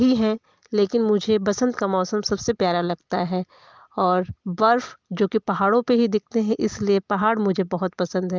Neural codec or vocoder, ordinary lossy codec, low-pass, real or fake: none; Opus, 24 kbps; 7.2 kHz; real